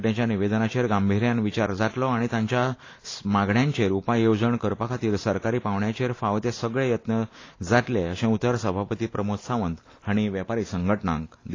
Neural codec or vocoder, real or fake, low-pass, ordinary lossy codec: none; real; 7.2 kHz; AAC, 32 kbps